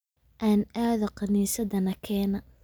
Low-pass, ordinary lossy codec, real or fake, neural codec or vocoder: none; none; real; none